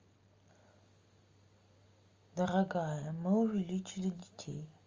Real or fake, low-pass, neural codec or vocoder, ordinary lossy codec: real; 7.2 kHz; none; Opus, 32 kbps